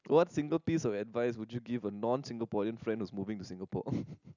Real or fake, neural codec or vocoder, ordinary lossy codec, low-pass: real; none; none; 7.2 kHz